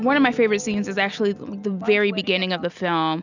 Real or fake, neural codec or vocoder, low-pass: real; none; 7.2 kHz